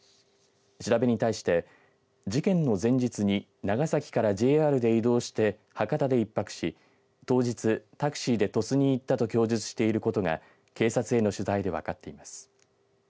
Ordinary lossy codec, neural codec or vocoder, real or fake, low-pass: none; none; real; none